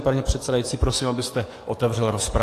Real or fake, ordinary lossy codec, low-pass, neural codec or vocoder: fake; AAC, 48 kbps; 14.4 kHz; codec, 44.1 kHz, 7.8 kbps, DAC